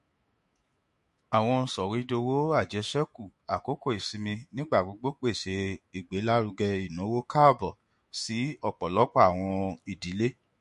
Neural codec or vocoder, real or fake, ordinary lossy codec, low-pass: autoencoder, 48 kHz, 128 numbers a frame, DAC-VAE, trained on Japanese speech; fake; MP3, 48 kbps; 14.4 kHz